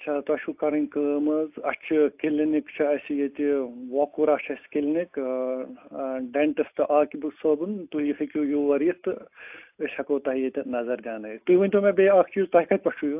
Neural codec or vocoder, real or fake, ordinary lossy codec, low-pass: none; real; AAC, 32 kbps; 3.6 kHz